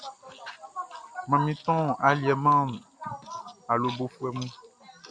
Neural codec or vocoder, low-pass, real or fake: none; 9.9 kHz; real